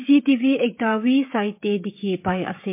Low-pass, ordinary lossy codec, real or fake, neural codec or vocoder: 3.6 kHz; MP3, 24 kbps; fake; codec, 16 kHz, 16 kbps, FreqCodec, smaller model